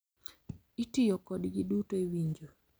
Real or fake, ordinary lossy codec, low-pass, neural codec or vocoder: real; none; none; none